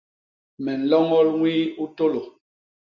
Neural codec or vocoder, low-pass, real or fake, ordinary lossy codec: none; 7.2 kHz; real; MP3, 48 kbps